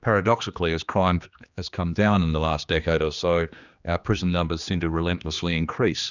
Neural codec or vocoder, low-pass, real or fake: codec, 16 kHz, 2 kbps, X-Codec, HuBERT features, trained on general audio; 7.2 kHz; fake